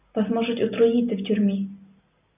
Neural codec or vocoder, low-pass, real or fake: none; 3.6 kHz; real